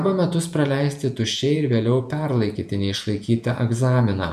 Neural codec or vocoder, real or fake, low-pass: vocoder, 48 kHz, 128 mel bands, Vocos; fake; 14.4 kHz